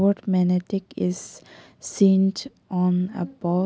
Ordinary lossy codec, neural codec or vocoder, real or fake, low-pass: none; none; real; none